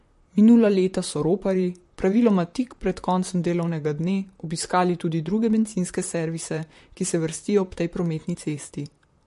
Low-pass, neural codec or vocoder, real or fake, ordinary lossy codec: 14.4 kHz; vocoder, 44.1 kHz, 128 mel bands, Pupu-Vocoder; fake; MP3, 48 kbps